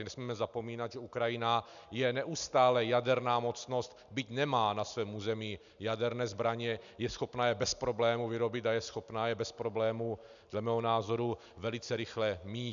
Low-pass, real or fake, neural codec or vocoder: 7.2 kHz; real; none